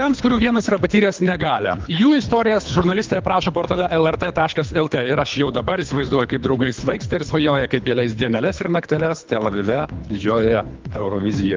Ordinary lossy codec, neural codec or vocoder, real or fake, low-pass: Opus, 24 kbps; codec, 24 kHz, 3 kbps, HILCodec; fake; 7.2 kHz